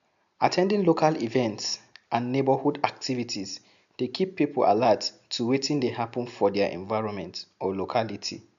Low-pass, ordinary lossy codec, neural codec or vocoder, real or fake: 7.2 kHz; AAC, 96 kbps; none; real